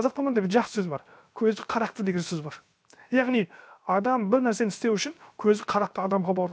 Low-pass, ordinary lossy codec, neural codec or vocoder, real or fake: none; none; codec, 16 kHz, 0.7 kbps, FocalCodec; fake